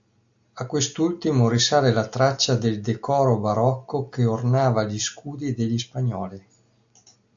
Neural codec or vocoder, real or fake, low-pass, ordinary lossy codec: none; real; 7.2 kHz; AAC, 64 kbps